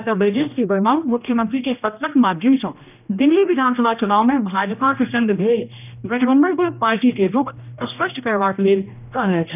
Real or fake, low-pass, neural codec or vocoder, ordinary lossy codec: fake; 3.6 kHz; codec, 16 kHz, 1 kbps, X-Codec, HuBERT features, trained on general audio; none